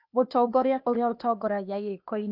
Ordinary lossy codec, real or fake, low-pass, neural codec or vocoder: none; fake; 5.4 kHz; codec, 16 kHz, 0.8 kbps, ZipCodec